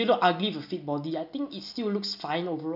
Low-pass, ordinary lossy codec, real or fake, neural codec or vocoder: 5.4 kHz; none; real; none